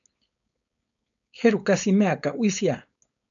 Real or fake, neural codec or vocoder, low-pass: fake; codec, 16 kHz, 4.8 kbps, FACodec; 7.2 kHz